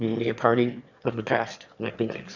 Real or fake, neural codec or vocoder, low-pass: fake; autoencoder, 22.05 kHz, a latent of 192 numbers a frame, VITS, trained on one speaker; 7.2 kHz